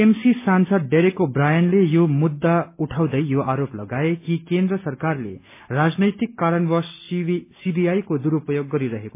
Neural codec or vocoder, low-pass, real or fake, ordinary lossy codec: none; 3.6 kHz; real; MP3, 24 kbps